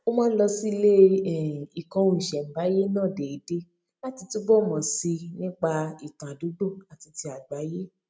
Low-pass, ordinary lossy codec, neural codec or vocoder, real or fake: none; none; none; real